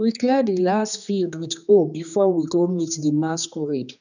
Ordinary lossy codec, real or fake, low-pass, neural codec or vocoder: none; fake; 7.2 kHz; codec, 16 kHz, 2 kbps, X-Codec, HuBERT features, trained on general audio